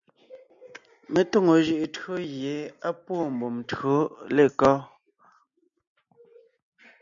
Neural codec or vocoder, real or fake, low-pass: none; real; 7.2 kHz